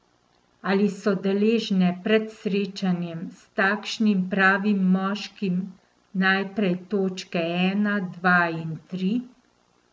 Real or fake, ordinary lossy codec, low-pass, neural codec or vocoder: real; none; none; none